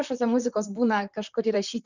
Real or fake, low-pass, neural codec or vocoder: fake; 7.2 kHz; vocoder, 44.1 kHz, 128 mel bands, Pupu-Vocoder